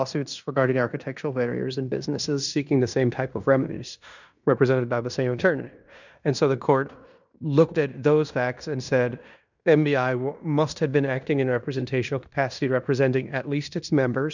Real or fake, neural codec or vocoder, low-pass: fake; codec, 16 kHz in and 24 kHz out, 0.9 kbps, LongCat-Audio-Codec, fine tuned four codebook decoder; 7.2 kHz